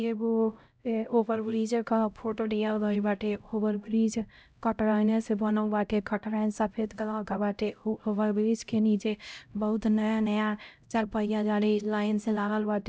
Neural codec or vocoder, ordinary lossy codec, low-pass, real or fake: codec, 16 kHz, 0.5 kbps, X-Codec, HuBERT features, trained on LibriSpeech; none; none; fake